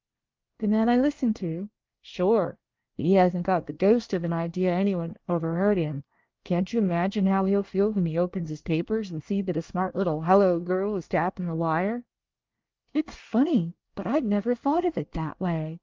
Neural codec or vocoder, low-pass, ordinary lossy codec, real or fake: codec, 24 kHz, 1 kbps, SNAC; 7.2 kHz; Opus, 32 kbps; fake